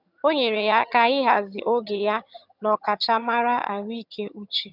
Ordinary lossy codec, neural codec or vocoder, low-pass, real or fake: none; vocoder, 22.05 kHz, 80 mel bands, HiFi-GAN; 5.4 kHz; fake